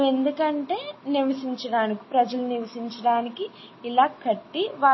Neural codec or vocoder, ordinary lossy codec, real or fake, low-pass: none; MP3, 24 kbps; real; 7.2 kHz